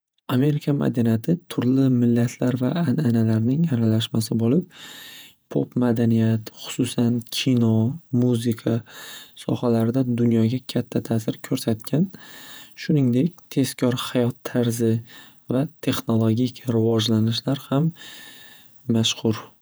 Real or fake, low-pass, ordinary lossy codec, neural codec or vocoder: fake; none; none; vocoder, 48 kHz, 128 mel bands, Vocos